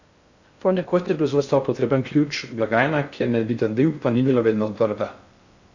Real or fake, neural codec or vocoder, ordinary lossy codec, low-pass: fake; codec, 16 kHz in and 24 kHz out, 0.6 kbps, FocalCodec, streaming, 2048 codes; Opus, 64 kbps; 7.2 kHz